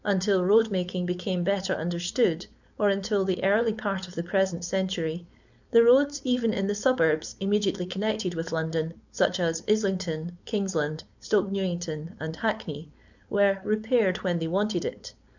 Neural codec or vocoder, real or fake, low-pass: codec, 16 kHz, 4.8 kbps, FACodec; fake; 7.2 kHz